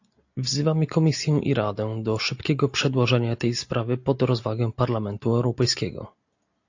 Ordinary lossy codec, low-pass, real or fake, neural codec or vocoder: AAC, 48 kbps; 7.2 kHz; real; none